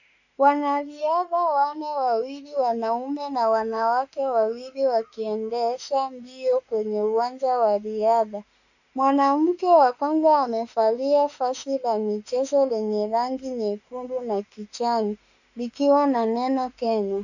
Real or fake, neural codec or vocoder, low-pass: fake; autoencoder, 48 kHz, 32 numbers a frame, DAC-VAE, trained on Japanese speech; 7.2 kHz